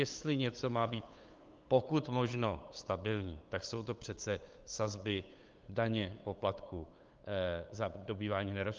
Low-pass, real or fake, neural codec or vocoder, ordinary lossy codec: 7.2 kHz; fake; codec, 16 kHz, 8 kbps, FunCodec, trained on LibriTTS, 25 frames a second; Opus, 24 kbps